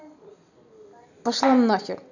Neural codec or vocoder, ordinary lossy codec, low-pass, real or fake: none; none; 7.2 kHz; real